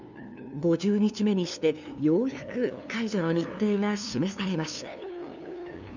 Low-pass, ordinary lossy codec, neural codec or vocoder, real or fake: 7.2 kHz; none; codec, 16 kHz, 2 kbps, FunCodec, trained on LibriTTS, 25 frames a second; fake